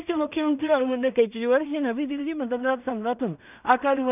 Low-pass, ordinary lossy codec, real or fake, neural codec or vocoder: 3.6 kHz; none; fake; codec, 16 kHz in and 24 kHz out, 0.4 kbps, LongCat-Audio-Codec, two codebook decoder